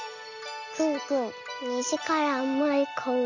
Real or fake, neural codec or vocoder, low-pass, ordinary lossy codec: real; none; 7.2 kHz; none